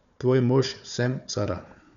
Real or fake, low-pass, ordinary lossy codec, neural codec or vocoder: fake; 7.2 kHz; none; codec, 16 kHz, 4 kbps, FunCodec, trained on Chinese and English, 50 frames a second